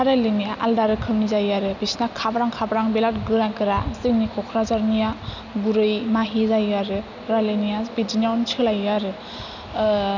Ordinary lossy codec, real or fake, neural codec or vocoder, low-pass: none; real; none; 7.2 kHz